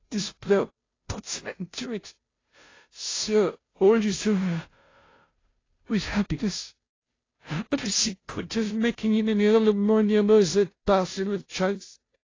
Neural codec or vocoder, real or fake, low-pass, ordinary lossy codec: codec, 16 kHz, 0.5 kbps, FunCodec, trained on Chinese and English, 25 frames a second; fake; 7.2 kHz; AAC, 32 kbps